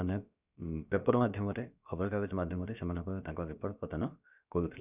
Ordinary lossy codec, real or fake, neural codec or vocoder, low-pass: none; fake; codec, 16 kHz, about 1 kbps, DyCAST, with the encoder's durations; 3.6 kHz